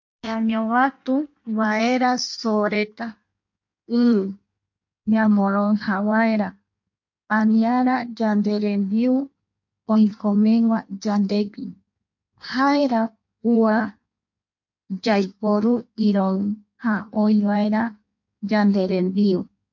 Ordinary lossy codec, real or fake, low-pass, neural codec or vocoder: MP3, 48 kbps; fake; 7.2 kHz; codec, 16 kHz in and 24 kHz out, 1.1 kbps, FireRedTTS-2 codec